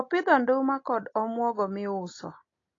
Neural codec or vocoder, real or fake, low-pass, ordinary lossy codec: none; real; 7.2 kHz; AAC, 32 kbps